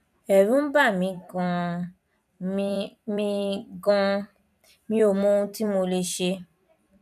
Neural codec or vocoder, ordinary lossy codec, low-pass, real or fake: vocoder, 44.1 kHz, 128 mel bands every 256 samples, BigVGAN v2; none; 14.4 kHz; fake